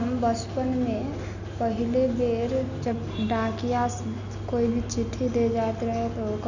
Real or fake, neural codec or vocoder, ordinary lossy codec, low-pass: real; none; none; 7.2 kHz